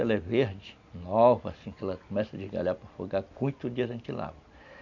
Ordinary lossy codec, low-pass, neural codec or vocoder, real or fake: none; 7.2 kHz; none; real